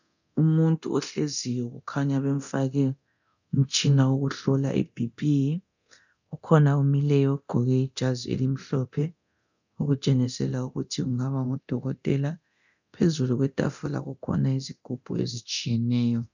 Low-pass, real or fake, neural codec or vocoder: 7.2 kHz; fake; codec, 24 kHz, 0.9 kbps, DualCodec